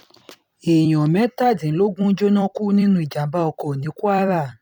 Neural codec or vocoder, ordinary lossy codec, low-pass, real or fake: vocoder, 44.1 kHz, 128 mel bands every 256 samples, BigVGAN v2; none; 19.8 kHz; fake